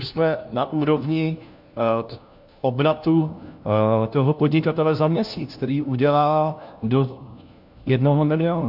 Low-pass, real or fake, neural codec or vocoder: 5.4 kHz; fake; codec, 16 kHz, 1 kbps, FunCodec, trained on LibriTTS, 50 frames a second